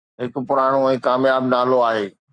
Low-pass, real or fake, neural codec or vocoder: 9.9 kHz; fake; codec, 44.1 kHz, 7.8 kbps, Pupu-Codec